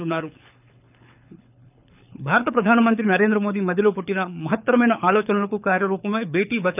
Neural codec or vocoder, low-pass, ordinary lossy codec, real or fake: codec, 24 kHz, 6 kbps, HILCodec; 3.6 kHz; none; fake